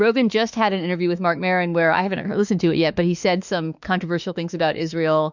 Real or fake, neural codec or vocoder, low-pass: fake; autoencoder, 48 kHz, 32 numbers a frame, DAC-VAE, trained on Japanese speech; 7.2 kHz